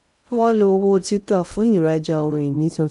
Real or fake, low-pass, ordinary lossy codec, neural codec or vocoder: fake; 10.8 kHz; none; codec, 16 kHz in and 24 kHz out, 0.6 kbps, FocalCodec, streaming, 2048 codes